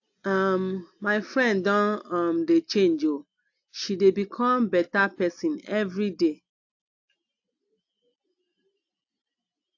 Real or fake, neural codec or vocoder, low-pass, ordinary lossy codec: real; none; 7.2 kHz; AAC, 48 kbps